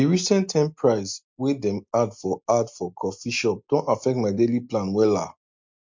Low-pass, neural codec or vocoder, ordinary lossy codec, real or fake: 7.2 kHz; none; MP3, 48 kbps; real